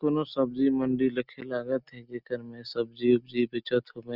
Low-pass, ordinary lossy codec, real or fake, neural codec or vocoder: 5.4 kHz; Opus, 64 kbps; real; none